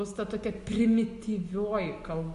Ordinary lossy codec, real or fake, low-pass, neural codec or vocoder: MP3, 64 kbps; real; 10.8 kHz; none